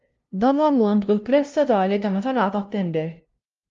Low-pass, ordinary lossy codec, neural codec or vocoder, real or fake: 7.2 kHz; Opus, 32 kbps; codec, 16 kHz, 0.5 kbps, FunCodec, trained on LibriTTS, 25 frames a second; fake